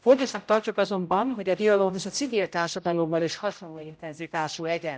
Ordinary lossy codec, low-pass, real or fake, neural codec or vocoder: none; none; fake; codec, 16 kHz, 0.5 kbps, X-Codec, HuBERT features, trained on general audio